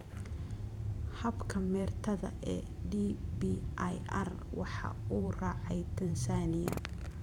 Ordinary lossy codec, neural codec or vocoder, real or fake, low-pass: none; vocoder, 44.1 kHz, 128 mel bands every 512 samples, BigVGAN v2; fake; 19.8 kHz